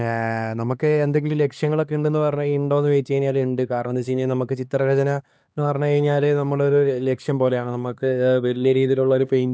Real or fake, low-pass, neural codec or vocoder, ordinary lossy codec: fake; none; codec, 16 kHz, 2 kbps, X-Codec, HuBERT features, trained on LibriSpeech; none